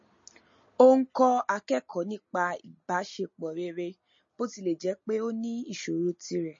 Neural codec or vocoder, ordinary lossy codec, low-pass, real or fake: none; MP3, 32 kbps; 7.2 kHz; real